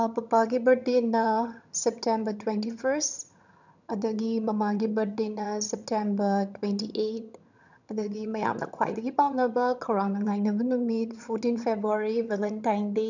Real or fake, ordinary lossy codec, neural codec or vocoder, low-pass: fake; none; vocoder, 22.05 kHz, 80 mel bands, HiFi-GAN; 7.2 kHz